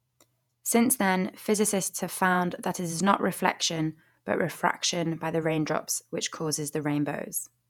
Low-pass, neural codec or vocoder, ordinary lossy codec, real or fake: 19.8 kHz; vocoder, 48 kHz, 128 mel bands, Vocos; none; fake